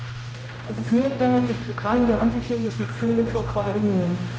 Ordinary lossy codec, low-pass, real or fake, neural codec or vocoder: none; none; fake; codec, 16 kHz, 0.5 kbps, X-Codec, HuBERT features, trained on general audio